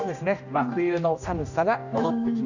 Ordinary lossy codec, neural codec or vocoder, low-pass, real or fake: none; codec, 16 kHz, 1 kbps, X-Codec, HuBERT features, trained on general audio; 7.2 kHz; fake